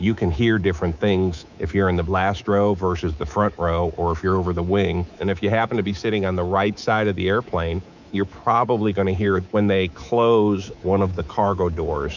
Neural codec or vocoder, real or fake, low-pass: codec, 24 kHz, 3.1 kbps, DualCodec; fake; 7.2 kHz